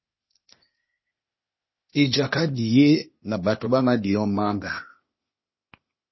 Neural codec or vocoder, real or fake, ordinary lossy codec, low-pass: codec, 16 kHz, 0.8 kbps, ZipCodec; fake; MP3, 24 kbps; 7.2 kHz